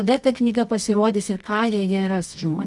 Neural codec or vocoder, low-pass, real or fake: codec, 24 kHz, 0.9 kbps, WavTokenizer, medium music audio release; 10.8 kHz; fake